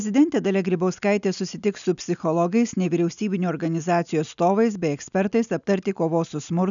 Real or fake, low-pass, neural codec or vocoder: real; 7.2 kHz; none